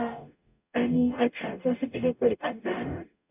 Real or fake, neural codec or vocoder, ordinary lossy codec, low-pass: fake; codec, 44.1 kHz, 0.9 kbps, DAC; none; 3.6 kHz